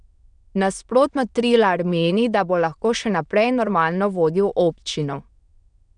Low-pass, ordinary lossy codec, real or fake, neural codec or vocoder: 9.9 kHz; none; fake; autoencoder, 22.05 kHz, a latent of 192 numbers a frame, VITS, trained on many speakers